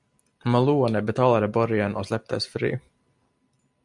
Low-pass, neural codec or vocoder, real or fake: 10.8 kHz; none; real